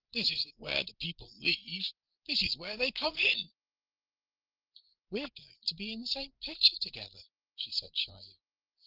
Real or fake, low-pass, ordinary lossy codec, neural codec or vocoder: fake; 5.4 kHz; Opus, 16 kbps; codec, 16 kHz, 8 kbps, FreqCodec, larger model